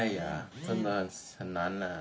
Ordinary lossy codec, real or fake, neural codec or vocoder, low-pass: none; real; none; none